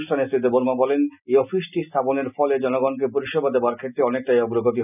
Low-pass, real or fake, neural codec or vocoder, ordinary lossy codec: 3.6 kHz; real; none; none